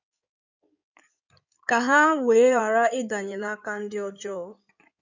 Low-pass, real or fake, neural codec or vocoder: 7.2 kHz; fake; codec, 16 kHz in and 24 kHz out, 2.2 kbps, FireRedTTS-2 codec